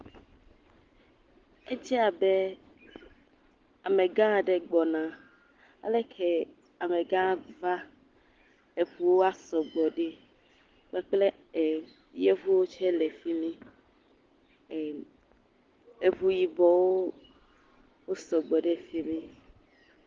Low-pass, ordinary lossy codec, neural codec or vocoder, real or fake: 7.2 kHz; Opus, 16 kbps; none; real